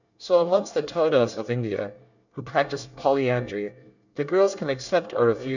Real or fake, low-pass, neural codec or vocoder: fake; 7.2 kHz; codec, 24 kHz, 1 kbps, SNAC